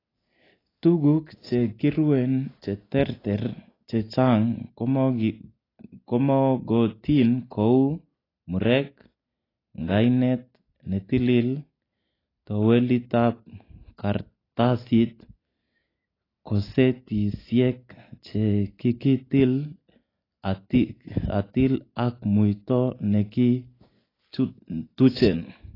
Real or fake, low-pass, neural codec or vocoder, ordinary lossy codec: real; 5.4 kHz; none; AAC, 24 kbps